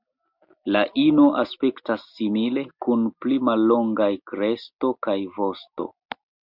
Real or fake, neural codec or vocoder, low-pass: real; none; 5.4 kHz